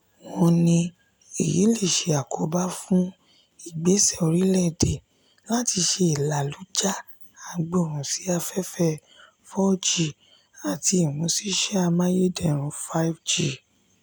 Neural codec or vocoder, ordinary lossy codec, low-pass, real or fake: none; none; none; real